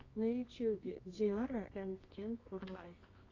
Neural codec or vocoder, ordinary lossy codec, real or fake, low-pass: codec, 24 kHz, 0.9 kbps, WavTokenizer, medium music audio release; AAC, 32 kbps; fake; 7.2 kHz